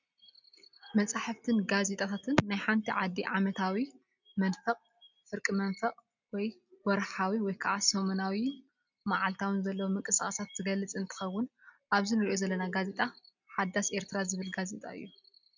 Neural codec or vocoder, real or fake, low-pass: none; real; 7.2 kHz